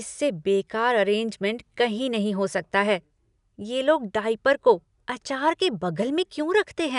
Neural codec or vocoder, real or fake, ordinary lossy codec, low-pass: none; real; none; 10.8 kHz